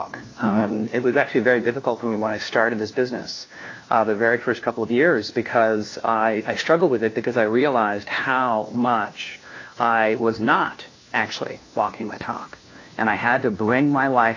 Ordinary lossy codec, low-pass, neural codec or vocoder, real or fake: AAC, 32 kbps; 7.2 kHz; codec, 16 kHz, 1 kbps, FunCodec, trained on LibriTTS, 50 frames a second; fake